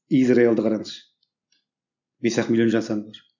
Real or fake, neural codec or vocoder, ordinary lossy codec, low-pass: real; none; none; 7.2 kHz